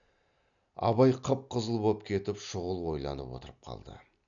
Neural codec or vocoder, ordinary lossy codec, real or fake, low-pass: none; none; real; 7.2 kHz